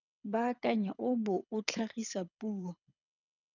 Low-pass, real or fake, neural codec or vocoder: 7.2 kHz; fake; codec, 24 kHz, 6 kbps, HILCodec